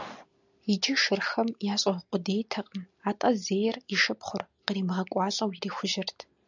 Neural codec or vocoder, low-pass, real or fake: none; 7.2 kHz; real